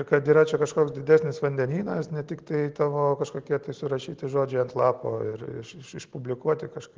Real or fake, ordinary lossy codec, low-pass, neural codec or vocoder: real; Opus, 16 kbps; 7.2 kHz; none